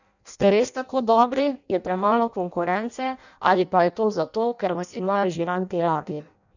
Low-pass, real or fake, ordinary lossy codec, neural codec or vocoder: 7.2 kHz; fake; none; codec, 16 kHz in and 24 kHz out, 0.6 kbps, FireRedTTS-2 codec